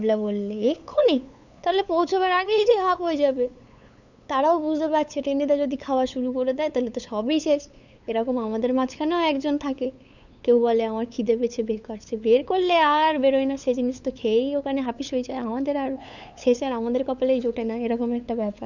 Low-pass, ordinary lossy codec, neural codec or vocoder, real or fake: 7.2 kHz; none; codec, 16 kHz, 8 kbps, FunCodec, trained on LibriTTS, 25 frames a second; fake